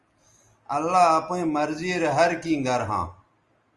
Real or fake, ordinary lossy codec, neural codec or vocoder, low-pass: real; Opus, 32 kbps; none; 10.8 kHz